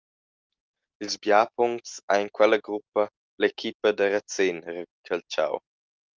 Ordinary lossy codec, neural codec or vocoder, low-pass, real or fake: Opus, 24 kbps; none; 7.2 kHz; real